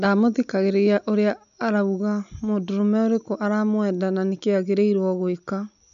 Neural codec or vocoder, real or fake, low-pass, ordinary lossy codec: none; real; 7.2 kHz; none